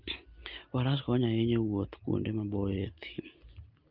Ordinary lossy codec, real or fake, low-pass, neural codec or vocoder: Opus, 32 kbps; real; 5.4 kHz; none